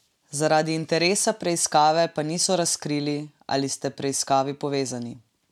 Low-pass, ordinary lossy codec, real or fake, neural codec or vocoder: 19.8 kHz; none; real; none